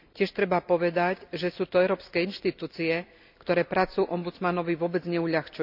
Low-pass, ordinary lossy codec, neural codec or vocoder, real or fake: 5.4 kHz; none; none; real